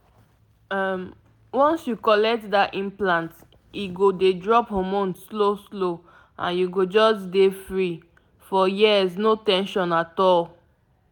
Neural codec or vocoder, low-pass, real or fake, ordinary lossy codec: none; 19.8 kHz; real; none